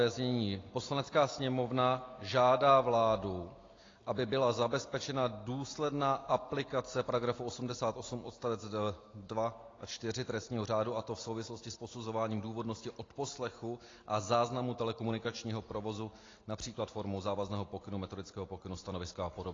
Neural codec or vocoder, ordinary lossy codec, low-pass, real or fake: none; AAC, 32 kbps; 7.2 kHz; real